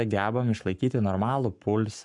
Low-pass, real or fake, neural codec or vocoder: 10.8 kHz; fake; codec, 44.1 kHz, 7.8 kbps, Pupu-Codec